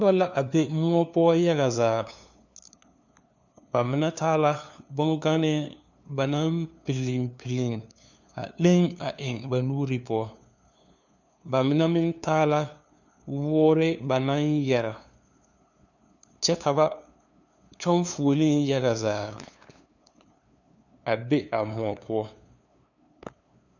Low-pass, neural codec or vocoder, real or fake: 7.2 kHz; codec, 16 kHz, 2 kbps, FunCodec, trained on LibriTTS, 25 frames a second; fake